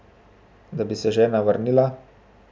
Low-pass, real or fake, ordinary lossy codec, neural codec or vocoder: none; real; none; none